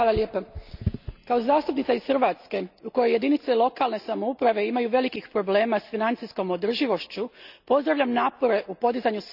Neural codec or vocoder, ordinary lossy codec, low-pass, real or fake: none; none; 5.4 kHz; real